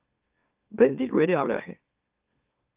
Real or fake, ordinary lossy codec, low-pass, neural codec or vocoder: fake; Opus, 24 kbps; 3.6 kHz; autoencoder, 44.1 kHz, a latent of 192 numbers a frame, MeloTTS